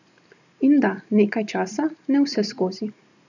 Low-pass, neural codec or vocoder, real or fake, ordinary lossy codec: 7.2 kHz; none; real; none